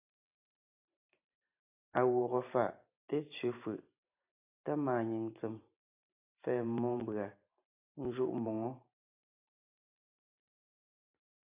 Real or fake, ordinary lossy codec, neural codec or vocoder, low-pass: fake; AAC, 32 kbps; vocoder, 44.1 kHz, 128 mel bands every 512 samples, BigVGAN v2; 3.6 kHz